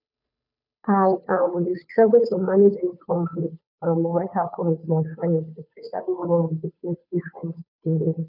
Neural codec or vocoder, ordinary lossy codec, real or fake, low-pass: codec, 16 kHz, 2 kbps, FunCodec, trained on Chinese and English, 25 frames a second; none; fake; 5.4 kHz